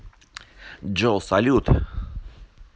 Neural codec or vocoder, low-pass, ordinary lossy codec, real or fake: none; none; none; real